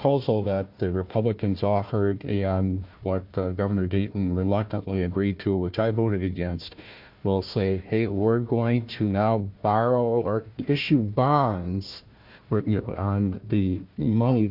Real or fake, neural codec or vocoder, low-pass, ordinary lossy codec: fake; codec, 16 kHz, 1 kbps, FunCodec, trained on Chinese and English, 50 frames a second; 5.4 kHz; MP3, 48 kbps